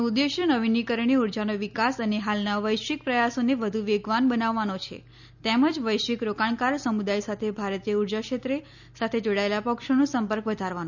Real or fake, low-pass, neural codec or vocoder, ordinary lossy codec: real; 7.2 kHz; none; none